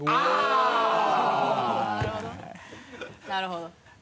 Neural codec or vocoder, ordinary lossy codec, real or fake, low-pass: none; none; real; none